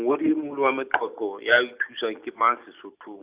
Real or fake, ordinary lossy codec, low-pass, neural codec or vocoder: real; Opus, 64 kbps; 3.6 kHz; none